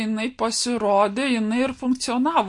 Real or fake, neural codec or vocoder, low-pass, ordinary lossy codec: real; none; 10.8 kHz; MP3, 48 kbps